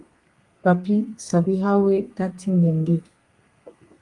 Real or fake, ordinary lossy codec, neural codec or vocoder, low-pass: fake; Opus, 32 kbps; codec, 32 kHz, 1.9 kbps, SNAC; 10.8 kHz